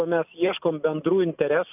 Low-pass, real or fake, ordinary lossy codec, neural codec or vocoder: 3.6 kHz; real; AAC, 32 kbps; none